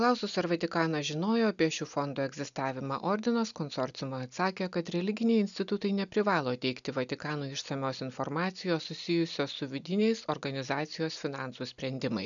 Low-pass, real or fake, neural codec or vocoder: 7.2 kHz; real; none